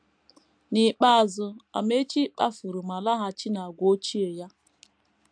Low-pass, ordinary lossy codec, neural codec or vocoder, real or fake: 9.9 kHz; AAC, 64 kbps; none; real